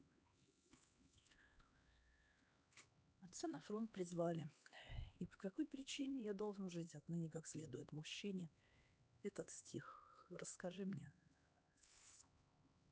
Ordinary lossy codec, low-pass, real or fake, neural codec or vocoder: none; none; fake; codec, 16 kHz, 2 kbps, X-Codec, HuBERT features, trained on LibriSpeech